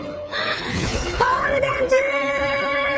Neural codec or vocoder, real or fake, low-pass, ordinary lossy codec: codec, 16 kHz, 4 kbps, FreqCodec, smaller model; fake; none; none